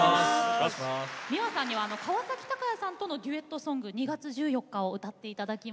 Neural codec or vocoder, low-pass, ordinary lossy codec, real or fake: none; none; none; real